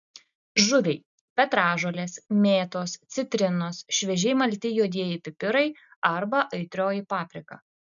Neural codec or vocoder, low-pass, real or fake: none; 7.2 kHz; real